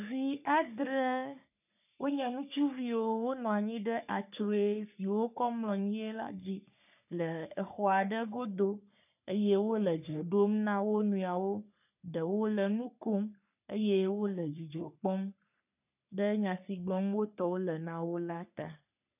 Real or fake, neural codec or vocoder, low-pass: fake; codec, 44.1 kHz, 3.4 kbps, Pupu-Codec; 3.6 kHz